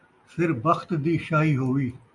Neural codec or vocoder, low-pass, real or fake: none; 10.8 kHz; real